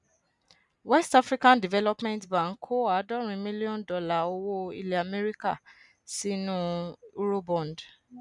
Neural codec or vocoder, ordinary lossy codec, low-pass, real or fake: none; none; 10.8 kHz; real